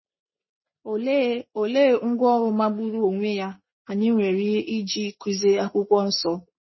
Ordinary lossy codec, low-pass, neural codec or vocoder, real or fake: MP3, 24 kbps; 7.2 kHz; none; real